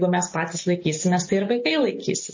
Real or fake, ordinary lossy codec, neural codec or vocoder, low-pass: real; MP3, 32 kbps; none; 7.2 kHz